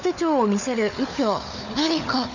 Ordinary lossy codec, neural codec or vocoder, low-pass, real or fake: none; codec, 16 kHz, 4 kbps, FunCodec, trained on Chinese and English, 50 frames a second; 7.2 kHz; fake